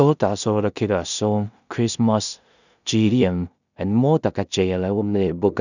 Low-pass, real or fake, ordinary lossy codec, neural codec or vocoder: 7.2 kHz; fake; none; codec, 16 kHz in and 24 kHz out, 0.4 kbps, LongCat-Audio-Codec, two codebook decoder